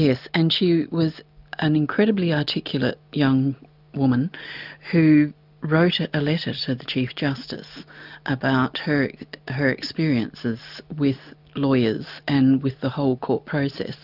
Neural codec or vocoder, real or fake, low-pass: none; real; 5.4 kHz